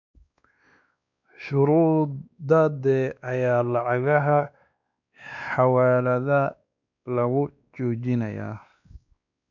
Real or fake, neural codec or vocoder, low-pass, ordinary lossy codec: fake; codec, 16 kHz, 2 kbps, X-Codec, WavLM features, trained on Multilingual LibriSpeech; 7.2 kHz; none